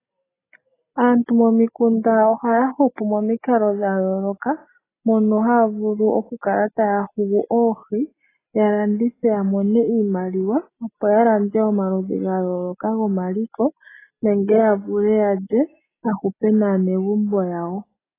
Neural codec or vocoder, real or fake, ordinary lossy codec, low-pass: none; real; AAC, 16 kbps; 3.6 kHz